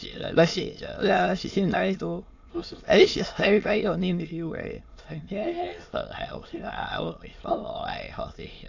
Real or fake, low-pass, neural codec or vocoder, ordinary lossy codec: fake; 7.2 kHz; autoencoder, 22.05 kHz, a latent of 192 numbers a frame, VITS, trained on many speakers; AAC, 48 kbps